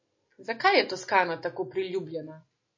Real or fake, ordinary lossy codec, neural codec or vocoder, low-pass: real; MP3, 32 kbps; none; 7.2 kHz